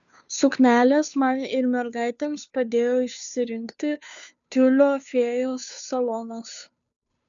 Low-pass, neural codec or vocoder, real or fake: 7.2 kHz; codec, 16 kHz, 2 kbps, FunCodec, trained on Chinese and English, 25 frames a second; fake